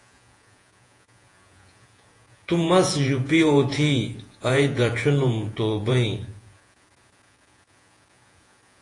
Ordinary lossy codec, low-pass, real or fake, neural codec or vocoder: AAC, 48 kbps; 10.8 kHz; fake; vocoder, 48 kHz, 128 mel bands, Vocos